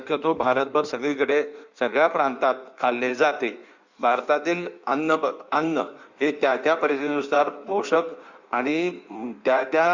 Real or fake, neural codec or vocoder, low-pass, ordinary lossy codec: fake; codec, 16 kHz in and 24 kHz out, 1.1 kbps, FireRedTTS-2 codec; 7.2 kHz; Opus, 64 kbps